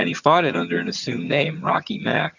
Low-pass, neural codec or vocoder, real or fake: 7.2 kHz; vocoder, 22.05 kHz, 80 mel bands, HiFi-GAN; fake